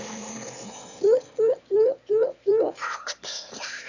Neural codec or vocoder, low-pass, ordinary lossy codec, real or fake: autoencoder, 22.05 kHz, a latent of 192 numbers a frame, VITS, trained on one speaker; 7.2 kHz; none; fake